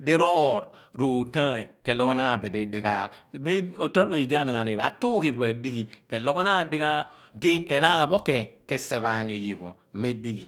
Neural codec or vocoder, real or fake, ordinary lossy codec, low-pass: codec, 44.1 kHz, 2.6 kbps, DAC; fake; none; 19.8 kHz